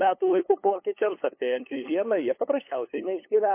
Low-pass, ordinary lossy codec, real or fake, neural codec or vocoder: 3.6 kHz; MP3, 24 kbps; fake; codec, 16 kHz, 8 kbps, FunCodec, trained on LibriTTS, 25 frames a second